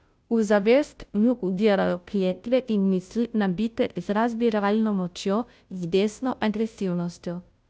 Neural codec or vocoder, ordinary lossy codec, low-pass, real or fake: codec, 16 kHz, 0.5 kbps, FunCodec, trained on Chinese and English, 25 frames a second; none; none; fake